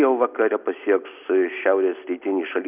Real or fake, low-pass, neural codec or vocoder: real; 3.6 kHz; none